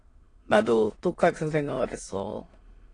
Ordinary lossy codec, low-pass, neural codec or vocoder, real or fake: AAC, 32 kbps; 9.9 kHz; autoencoder, 22.05 kHz, a latent of 192 numbers a frame, VITS, trained on many speakers; fake